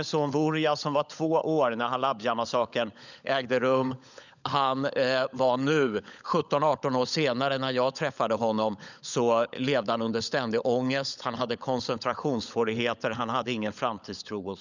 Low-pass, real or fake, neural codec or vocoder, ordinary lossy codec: 7.2 kHz; fake; codec, 16 kHz, 16 kbps, FunCodec, trained on LibriTTS, 50 frames a second; none